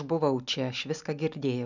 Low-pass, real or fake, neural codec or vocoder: 7.2 kHz; real; none